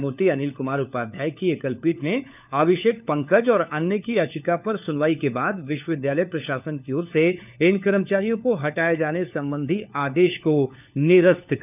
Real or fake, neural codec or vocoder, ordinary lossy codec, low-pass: fake; codec, 16 kHz, 4 kbps, FunCodec, trained on LibriTTS, 50 frames a second; none; 3.6 kHz